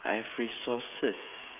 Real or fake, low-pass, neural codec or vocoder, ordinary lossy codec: fake; 3.6 kHz; codec, 16 kHz, 16 kbps, FreqCodec, smaller model; none